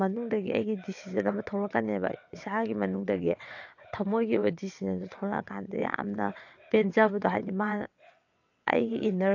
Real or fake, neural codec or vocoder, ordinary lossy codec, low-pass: fake; vocoder, 22.05 kHz, 80 mel bands, WaveNeXt; AAC, 48 kbps; 7.2 kHz